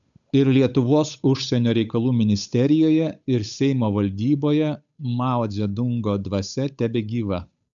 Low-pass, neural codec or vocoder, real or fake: 7.2 kHz; codec, 16 kHz, 8 kbps, FunCodec, trained on Chinese and English, 25 frames a second; fake